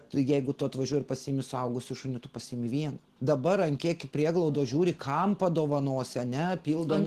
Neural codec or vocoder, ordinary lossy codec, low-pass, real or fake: none; Opus, 16 kbps; 14.4 kHz; real